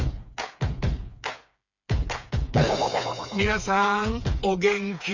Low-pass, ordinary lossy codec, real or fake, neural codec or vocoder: 7.2 kHz; none; fake; codec, 16 kHz, 4 kbps, FreqCodec, smaller model